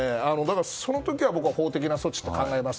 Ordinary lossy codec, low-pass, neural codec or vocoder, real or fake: none; none; none; real